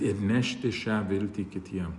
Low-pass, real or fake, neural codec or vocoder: 10.8 kHz; real; none